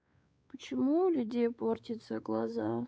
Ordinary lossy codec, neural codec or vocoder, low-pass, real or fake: none; codec, 16 kHz, 4 kbps, X-Codec, WavLM features, trained on Multilingual LibriSpeech; none; fake